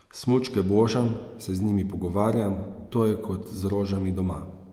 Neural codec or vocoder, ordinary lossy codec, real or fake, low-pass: vocoder, 48 kHz, 128 mel bands, Vocos; Opus, 32 kbps; fake; 19.8 kHz